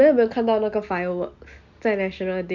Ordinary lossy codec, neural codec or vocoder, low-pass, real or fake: none; none; 7.2 kHz; real